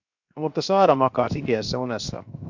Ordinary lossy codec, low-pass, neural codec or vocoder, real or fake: AAC, 48 kbps; 7.2 kHz; codec, 16 kHz, 0.7 kbps, FocalCodec; fake